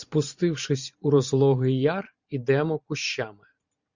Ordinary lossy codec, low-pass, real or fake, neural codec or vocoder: Opus, 64 kbps; 7.2 kHz; fake; vocoder, 44.1 kHz, 128 mel bands every 512 samples, BigVGAN v2